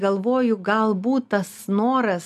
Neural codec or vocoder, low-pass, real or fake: none; 14.4 kHz; real